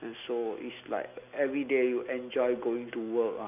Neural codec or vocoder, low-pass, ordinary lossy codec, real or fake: none; 3.6 kHz; none; real